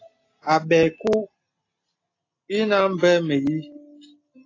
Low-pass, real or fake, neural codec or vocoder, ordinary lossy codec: 7.2 kHz; real; none; AAC, 32 kbps